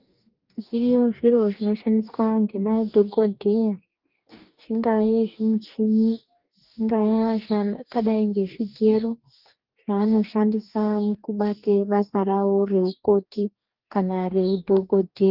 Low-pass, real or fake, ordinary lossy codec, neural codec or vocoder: 5.4 kHz; fake; Opus, 24 kbps; codec, 44.1 kHz, 2.6 kbps, DAC